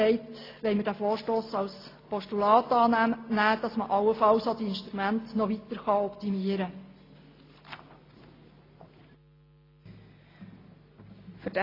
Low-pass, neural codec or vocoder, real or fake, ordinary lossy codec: 5.4 kHz; none; real; AAC, 24 kbps